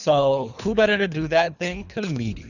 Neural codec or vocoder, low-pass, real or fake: codec, 24 kHz, 3 kbps, HILCodec; 7.2 kHz; fake